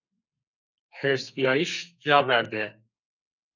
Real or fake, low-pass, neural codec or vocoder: fake; 7.2 kHz; codec, 32 kHz, 1.9 kbps, SNAC